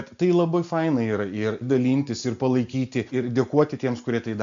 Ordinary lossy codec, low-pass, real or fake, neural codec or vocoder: MP3, 64 kbps; 7.2 kHz; real; none